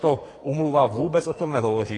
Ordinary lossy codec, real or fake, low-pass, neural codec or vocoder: AAC, 32 kbps; fake; 10.8 kHz; codec, 44.1 kHz, 2.6 kbps, SNAC